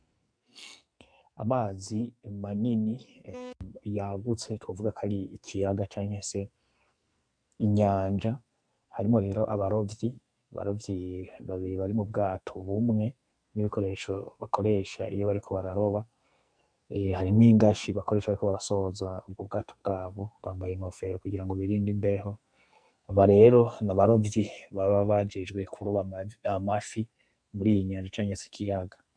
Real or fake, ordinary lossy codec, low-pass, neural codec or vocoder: fake; MP3, 96 kbps; 9.9 kHz; codec, 44.1 kHz, 2.6 kbps, SNAC